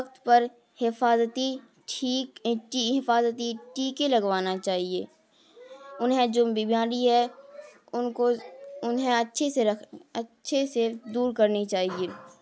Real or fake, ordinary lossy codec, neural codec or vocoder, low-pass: real; none; none; none